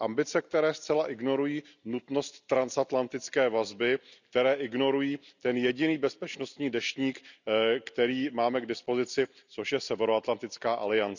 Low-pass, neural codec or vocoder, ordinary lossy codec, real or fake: 7.2 kHz; none; none; real